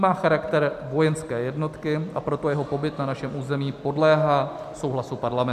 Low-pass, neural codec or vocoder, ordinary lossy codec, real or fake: 14.4 kHz; none; AAC, 96 kbps; real